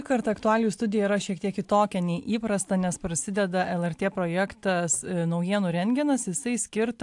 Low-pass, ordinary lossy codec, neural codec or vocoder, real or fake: 10.8 kHz; AAC, 64 kbps; none; real